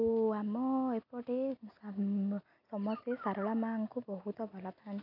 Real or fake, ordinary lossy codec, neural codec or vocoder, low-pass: real; AAC, 32 kbps; none; 5.4 kHz